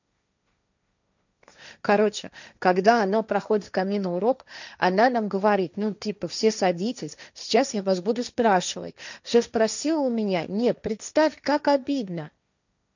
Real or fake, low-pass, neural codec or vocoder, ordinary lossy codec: fake; 7.2 kHz; codec, 16 kHz, 1.1 kbps, Voila-Tokenizer; none